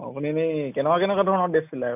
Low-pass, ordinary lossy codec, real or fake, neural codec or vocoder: 3.6 kHz; none; real; none